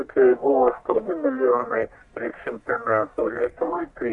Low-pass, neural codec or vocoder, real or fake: 10.8 kHz; codec, 44.1 kHz, 1.7 kbps, Pupu-Codec; fake